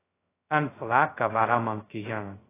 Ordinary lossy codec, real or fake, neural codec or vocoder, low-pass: AAC, 16 kbps; fake; codec, 16 kHz, 0.2 kbps, FocalCodec; 3.6 kHz